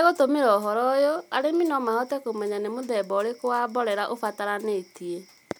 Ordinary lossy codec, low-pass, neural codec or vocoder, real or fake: none; none; none; real